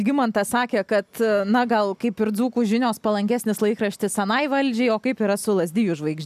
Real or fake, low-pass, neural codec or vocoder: real; 14.4 kHz; none